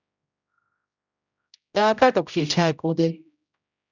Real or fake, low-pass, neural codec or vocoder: fake; 7.2 kHz; codec, 16 kHz, 0.5 kbps, X-Codec, HuBERT features, trained on general audio